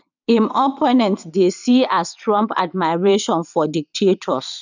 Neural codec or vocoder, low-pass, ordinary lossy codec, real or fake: vocoder, 22.05 kHz, 80 mel bands, WaveNeXt; 7.2 kHz; none; fake